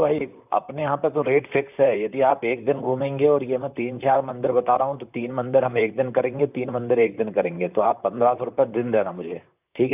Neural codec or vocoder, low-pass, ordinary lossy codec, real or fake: vocoder, 44.1 kHz, 128 mel bands, Pupu-Vocoder; 3.6 kHz; none; fake